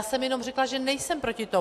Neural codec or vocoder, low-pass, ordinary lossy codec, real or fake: none; 14.4 kHz; AAC, 64 kbps; real